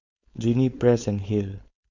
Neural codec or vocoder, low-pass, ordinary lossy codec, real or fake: codec, 16 kHz, 4.8 kbps, FACodec; 7.2 kHz; MP3, 64 kbps; fake